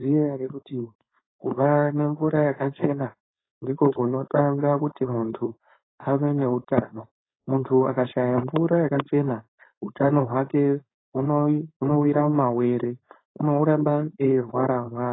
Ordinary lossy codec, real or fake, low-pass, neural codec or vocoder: AAC, 16 kbps; fake; 7.2 kHz; codec, 16 kHz, 4.8 kbps, FACodec